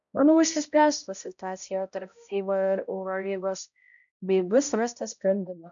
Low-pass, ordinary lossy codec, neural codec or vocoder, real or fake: 7.2 kHz; MP3, 96 kbps; codec, 16 kHz, 0.5 kbps, X-Codec, HuBERT features, trained on balanced general audio; fake